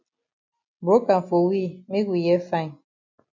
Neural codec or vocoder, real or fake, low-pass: none; real; 7.2 kHz